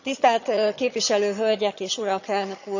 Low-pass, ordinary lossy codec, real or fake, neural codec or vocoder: 7.2 kHz; none; fake; vocoder, 22.05 kHz, 80 mel bands, HiFi-GAN